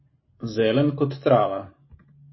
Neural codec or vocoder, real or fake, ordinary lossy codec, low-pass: none; real; MP3, 24 kbps; 7.2 kHz